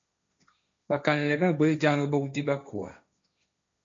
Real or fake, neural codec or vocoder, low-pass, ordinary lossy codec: fake; codec, 16 kHz, 1.1 kbps, Voila-Tokenizer; 7.2 kHz; MP3, 48 kbps